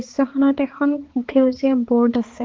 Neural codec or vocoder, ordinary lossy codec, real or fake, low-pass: codec, 16 kHz, 4 kbps, X-Codec, HuBERT features, trained on balanced general audio; Opus, 16 kbps; fake; 7.2 kHz